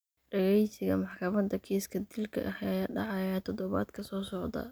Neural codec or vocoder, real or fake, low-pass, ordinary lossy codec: none; real; none; none